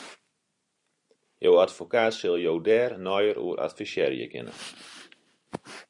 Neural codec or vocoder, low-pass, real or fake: none; 10.8 kHz; real